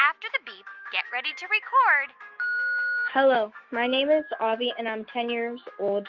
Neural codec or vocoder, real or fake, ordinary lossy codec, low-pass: autoencoder, 48 kHz, 128 numbers a frame, DAC-VAE, trained on Japanese speech; fake; Opus, 32 kbps; 7.2 kHz